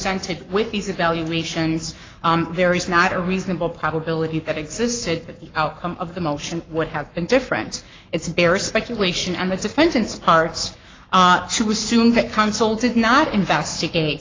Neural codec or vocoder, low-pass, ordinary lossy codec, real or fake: codec, 44.1 kHz, 7.8 kbps, Pupu-Codec; 7.2 kHz; AAC, 32 kbps; fake